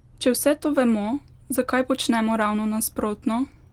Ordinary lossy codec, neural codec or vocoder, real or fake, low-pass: Opus, 24 kbps; none; real; 19.8 kHz